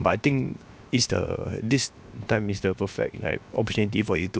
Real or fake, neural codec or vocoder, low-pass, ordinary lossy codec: fake; codec, 16 kHz, 0.7 kbps, FocalCodec; none; none